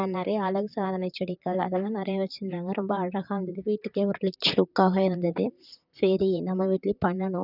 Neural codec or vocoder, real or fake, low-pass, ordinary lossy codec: vocoder, 44.1 kHz, 128 mel bands, Pupu-Vocoder; fake; 5.4 kHz; none